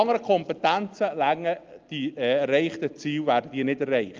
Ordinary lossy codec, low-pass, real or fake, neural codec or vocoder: Opus, 24 kbps; 7.2 kHz; real; none